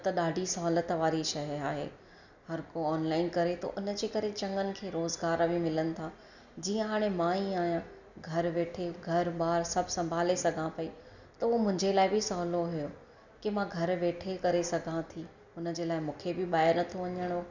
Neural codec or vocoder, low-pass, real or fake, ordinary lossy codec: none; 7.2 kHz; real; none